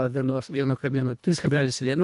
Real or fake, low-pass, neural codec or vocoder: fake; 10.8 kHz; codec, 24 kHz, 1.5 kbps, HILCodec